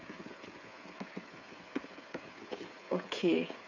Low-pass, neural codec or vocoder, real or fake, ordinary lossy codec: 7.2 kHz; codec, 16 kHz, 8 kbps, FreqCodec, smaller model; fake; none